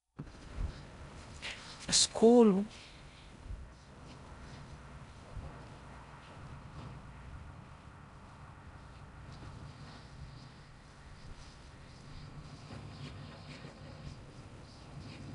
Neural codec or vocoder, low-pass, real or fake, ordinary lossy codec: codec, 16 kHz in and 24 kHz out, 0.6 kbps, FocalCodec, streaming, 4096 codes; 10.8 kHz; fake; none